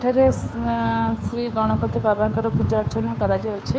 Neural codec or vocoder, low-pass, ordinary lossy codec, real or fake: codec, 16 kHz, 2 kbps, FunCodec, trained on Chinese and English, 25 frames a second; none; none; fake